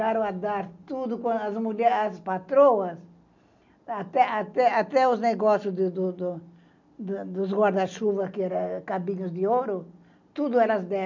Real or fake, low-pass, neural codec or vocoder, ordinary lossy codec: real; 7.2 kHz; none; none